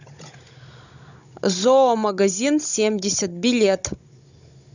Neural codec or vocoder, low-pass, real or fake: codec, 16 kHz, 16 kbps, FunCodec, trained on Chinese and English, 50 frames a second; 7.2 kHz; fake